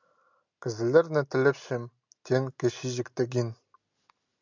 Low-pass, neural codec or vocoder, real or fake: 7.2 kHz; none; real